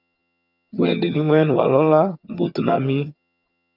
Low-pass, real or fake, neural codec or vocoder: 5.4 kHz; fake; vocoder, 22.05 kHz, 80 mel bands, HiFi-GAN